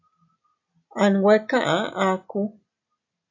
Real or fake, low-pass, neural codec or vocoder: real; 7.2 kHz; none